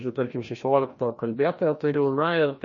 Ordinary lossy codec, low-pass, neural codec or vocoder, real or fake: MP3, 32 kbps; 7.2 kHz; codec, 16 kHz, 1 kbps, FreqCodec, larger model; fake